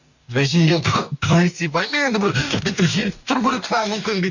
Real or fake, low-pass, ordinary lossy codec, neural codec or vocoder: fake; 7.2 kHz; none; codec, 44.1 kHz, 2.6 kbps, DAC